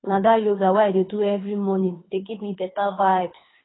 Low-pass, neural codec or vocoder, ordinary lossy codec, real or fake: 7.2 kHz; codec, 24 kHz, 3 kbps, HILCodec; AAC, 16 kbps; fake